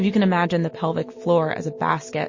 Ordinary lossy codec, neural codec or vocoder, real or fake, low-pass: MP3, 32 kbps; none; real; 7.2 kHz